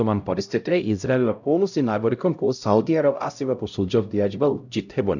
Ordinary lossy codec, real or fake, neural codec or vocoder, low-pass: none; fake; codec, 16 kHz, 0.5 kbps, X-Codec, HuBERT features, trained on LibriSpeech; 7.2 kHz